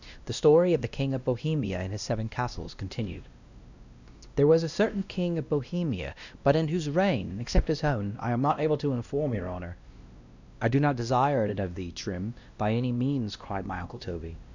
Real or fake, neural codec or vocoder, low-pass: fake; codec, 16 kHz, 1 kbps, X-Codec, WavLM features, trained on Multilingual LibriSpeech; 7.2 kHz